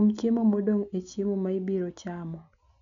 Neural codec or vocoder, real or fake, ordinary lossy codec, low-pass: none; real; none; 7.2 kHz